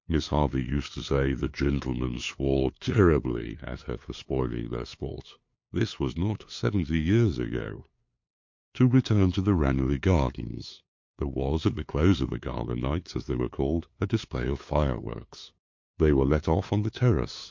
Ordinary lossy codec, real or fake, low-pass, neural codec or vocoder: MP3, 48 kbps; fake; 7.2 kHz; codec, 16 kHz, 2 kbps, FunCodec, trained on LibriTTS, 25 frames a second